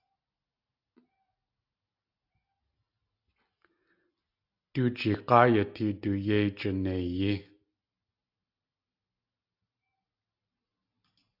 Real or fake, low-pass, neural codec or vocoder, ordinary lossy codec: real; 5.4 kHz; none; AAC, 48 kbps